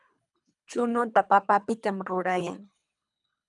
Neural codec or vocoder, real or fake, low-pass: codec, 24 kHz, 3 kbps, HILCodec; fake; 10.8 kHz